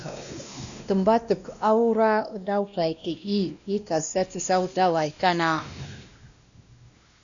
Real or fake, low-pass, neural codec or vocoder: fake; 7.2 kHz; codec, 16 kHz, 1 kbps, X-Codec, WavLM features, trained on Multilingual LibriSpeech